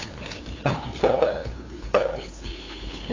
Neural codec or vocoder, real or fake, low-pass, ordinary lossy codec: codec, 16 kHz, 2 kbps, FunCodec, trained on LibriTTS, 25 frames a second; fake; 7.2 kHz; AAC, 32 kbps